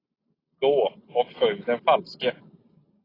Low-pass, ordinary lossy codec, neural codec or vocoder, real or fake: 5.4 kHz; AAC, 24 kbps; none; real